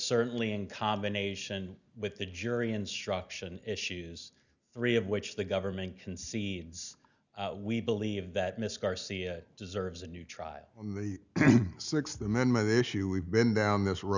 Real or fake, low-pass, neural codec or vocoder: real; 7.2 kHz; none